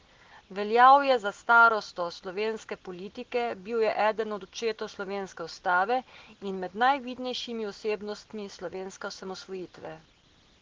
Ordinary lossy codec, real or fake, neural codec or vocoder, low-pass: Opus, 16 kbps; real; none; 7.2 kHz